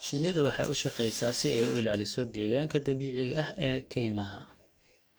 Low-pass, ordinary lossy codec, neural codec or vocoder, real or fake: none; none; codec, 44.1 kHz, 2.6 kbps, DAC; fake